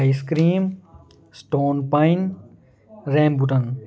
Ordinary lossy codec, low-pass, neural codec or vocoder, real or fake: none; none; none; real